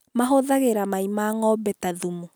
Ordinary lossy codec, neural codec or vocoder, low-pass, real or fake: none; none; none; real